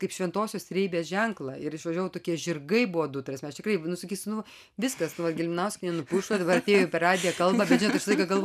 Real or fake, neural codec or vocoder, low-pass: real; none; 14.4 kHz